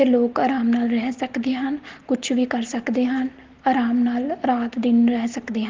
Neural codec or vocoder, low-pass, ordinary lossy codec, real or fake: none; 7.2 kHz; Opus, 16 kbps; real